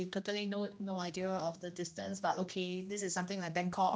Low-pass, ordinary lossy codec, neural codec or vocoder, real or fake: none; none; codec, 16 kHz, 1 kbps, X-Codec, HuBERT features, trained on general audio; fake